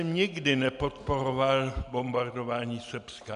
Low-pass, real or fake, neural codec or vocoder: 10.8 kHz; real; none